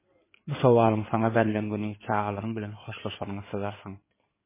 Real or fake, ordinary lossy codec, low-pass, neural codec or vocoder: fake; MP3, 16 kbps; 3.6 kHz; codec, 16 kHz in and 24 kHz out, 2.2 kbps, FireRedTTS-2 codec